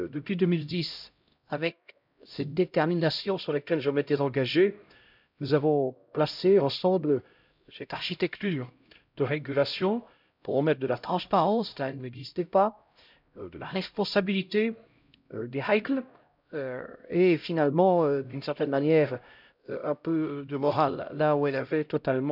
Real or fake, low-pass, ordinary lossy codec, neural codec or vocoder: fake; 5.4 kHz; none; codec, 16 kHz, 0.5 kbps, X-Codec, HuBERT features, trained on LibriSpeech